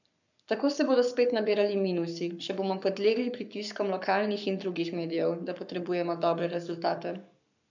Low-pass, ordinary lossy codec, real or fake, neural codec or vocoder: 7.2 kHz; none; fake; codec, 44.1 kHz, 7.8 kbps, Pupu-Codec